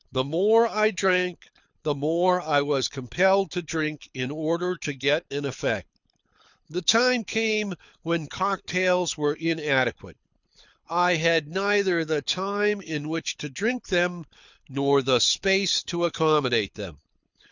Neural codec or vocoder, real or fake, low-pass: codec, 24 kHz, 6 kbps, HILCodec; fake; 7.2 kHz